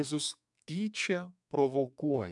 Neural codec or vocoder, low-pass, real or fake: codec, 32 kHz, 1.9 kbps, SNAC; 10.8 kHz; fake